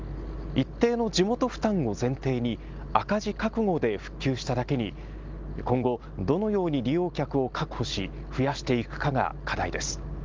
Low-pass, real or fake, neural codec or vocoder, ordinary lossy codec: 7.2 kHz; real; none; Opus, 32 kbps